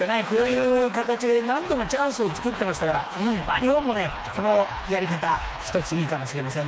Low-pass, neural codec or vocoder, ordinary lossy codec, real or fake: none; codec, 16 kHz, 2 kbps, FreqCodec, smaller model; none; fake